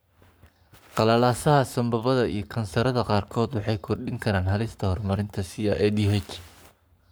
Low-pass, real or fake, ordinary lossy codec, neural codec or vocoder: none; fake; none; codec, 44.1 kHz, 7.8 kbps, Pupu-Codec